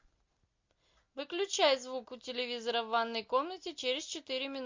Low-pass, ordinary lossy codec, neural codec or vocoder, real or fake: 7.2 kHz; MP3, 48 kbps; none; real